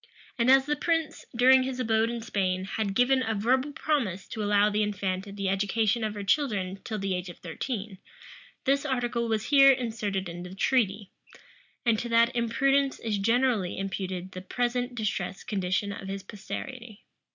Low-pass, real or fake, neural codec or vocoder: 7.2 kHz; real; none